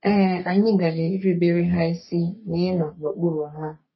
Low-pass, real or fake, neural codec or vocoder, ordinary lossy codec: 7.2 kHz; fake; codec, 44.1 kHz, 3.4 kbps, Pupu-Codec; MP3, 24 kbps